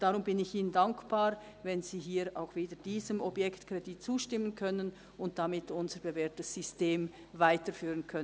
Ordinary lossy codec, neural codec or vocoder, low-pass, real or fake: none; none; none; real